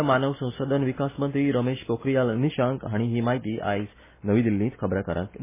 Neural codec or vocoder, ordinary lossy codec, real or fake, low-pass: none; MP3, 16 kbps; real; 3.6 kHz